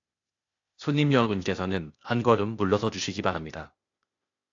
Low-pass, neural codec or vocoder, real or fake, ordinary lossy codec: 7.2 kHz; codec, 16 kHz, 0.8 kbps, ZipCodec; fake; AAC, 48 kbps